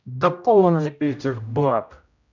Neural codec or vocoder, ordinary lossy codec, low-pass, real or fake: codec, 16 kHz, 0.5 kbps, X-Codec, HuBERT features, trained on general audio; none; 7.2 kHz; fake